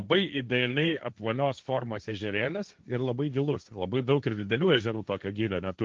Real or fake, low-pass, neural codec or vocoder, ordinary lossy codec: fake; 7.2 kHz; codec, 16 kHz, 1.1 kbps, Voila-Tokenizer; Opus, 24 kbps